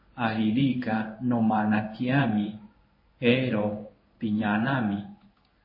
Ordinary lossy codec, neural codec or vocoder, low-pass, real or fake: MP3, 24 kbps; codec, 16 kHz in and 24 kHz out, 1 kbps, XY-Tokenizer; 5.4 kHz; fake